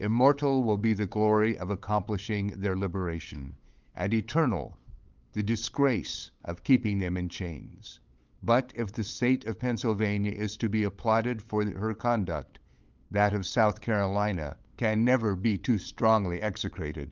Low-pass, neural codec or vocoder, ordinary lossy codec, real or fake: 7.2 kHz; codec, 16 kHz, 8 kbps, FunCodec, trained on LibriTTS, 25 frames a second; Opus, 24 kbps; fake